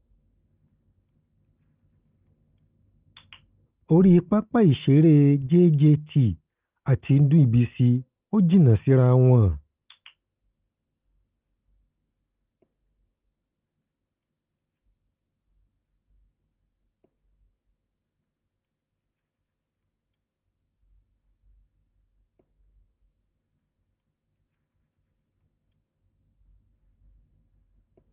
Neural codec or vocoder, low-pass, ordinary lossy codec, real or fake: none; 3.6 kHz; Opus, 32 kbps; real